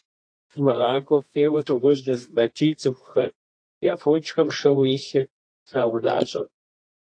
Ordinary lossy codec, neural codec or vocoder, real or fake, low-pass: AAC, 48 kbps; codec, 24 kHz, 0.9 kbps, WavTokenizer, medium music audio release; fake; 9.9 kHz